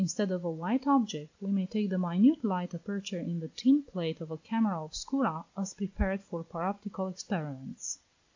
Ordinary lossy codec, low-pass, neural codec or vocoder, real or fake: AAC, 48 kbps; 7.2 kHz; none; real